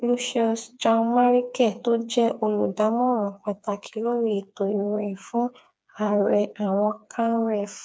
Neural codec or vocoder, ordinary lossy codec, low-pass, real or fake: codec, 16 kHz, 4 kbps, FreqCodec, smaller model; none; none; fake